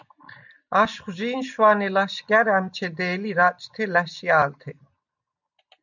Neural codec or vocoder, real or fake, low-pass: none; real; 7.2 kHz